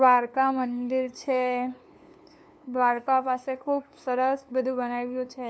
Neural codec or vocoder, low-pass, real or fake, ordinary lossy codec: codec, 16 kHz, 2 kbps, FunCodec, trained on LibriTTS, 25 frames a second; none; fake; none